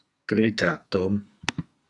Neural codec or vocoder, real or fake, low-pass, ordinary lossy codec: codec, 44.1 kHz, 2.6 kbps, SNAC; fake; 10.8 kHz; Opus, 64 kbps